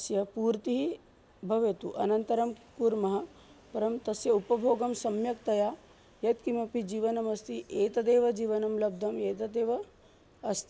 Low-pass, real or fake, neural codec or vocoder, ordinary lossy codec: none; real; none; none